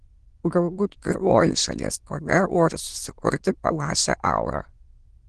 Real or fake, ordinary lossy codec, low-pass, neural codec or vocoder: fake; Opus, 16 kbps; 9.9 kHz; autoencoder, 22.05 kHz, a latent of 192 numbers a frame, VITS, trained on many speakers